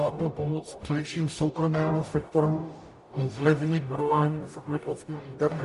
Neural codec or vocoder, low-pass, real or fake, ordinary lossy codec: codec, 44.1 kHz, 0.9 kbps, DAC; 14.4 kHz; fake; MP3, 48 kbps